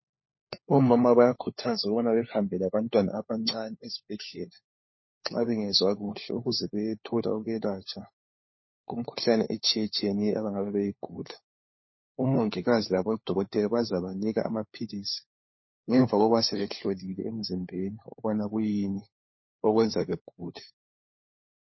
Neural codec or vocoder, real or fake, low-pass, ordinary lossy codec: codec, 16 kHz, 4 kbps, FunCodec, trained on LibriTTS, 50 frames a second; fake; 7.2 kHz; MP3, 24 kbps